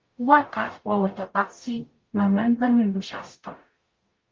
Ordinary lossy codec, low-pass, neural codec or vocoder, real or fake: Opus, 32 kbps; 7.2 kHz; codec, 44.1 kHz, 0.9 kbps, DAC; fake